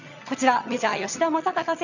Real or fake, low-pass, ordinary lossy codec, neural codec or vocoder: fake; 7.2 kHz; none; vocoder, 22.05 kHz, 80 mel bands, HiFi-GAN